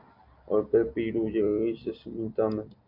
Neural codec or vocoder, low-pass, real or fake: none; 5.4 kHz; real